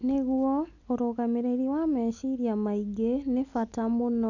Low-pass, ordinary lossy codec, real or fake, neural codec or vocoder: 7.2 kHz; none; real; none